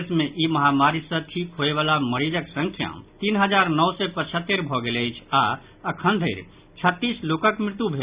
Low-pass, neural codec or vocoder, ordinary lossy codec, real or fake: 3.6 kHz; none; Opus, 64 kbps; real